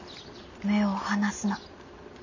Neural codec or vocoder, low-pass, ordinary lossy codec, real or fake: none; 7.2 kHz; none; real